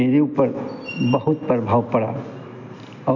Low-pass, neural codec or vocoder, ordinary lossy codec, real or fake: 7.2 kHz; none; none; real